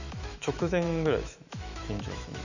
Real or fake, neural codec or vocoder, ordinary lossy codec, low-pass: fake; autoencoder, 48 kHz, 128 numbers a frame, DAC-VAE, trained on Japanese speech; none; 7.2 kHz